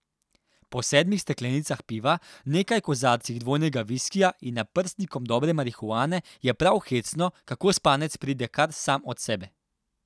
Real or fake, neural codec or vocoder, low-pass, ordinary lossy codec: real; none; none; none